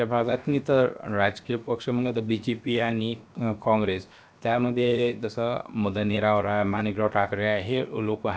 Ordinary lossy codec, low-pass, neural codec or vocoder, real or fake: none; none; codec, 16 kHz, 0.7 kbps, FocalCodec; fake